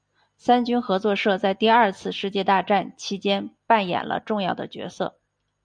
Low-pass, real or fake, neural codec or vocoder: 9.9 kHz; real; none